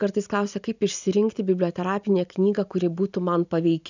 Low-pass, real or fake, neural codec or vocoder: 7.2 kHz; real; none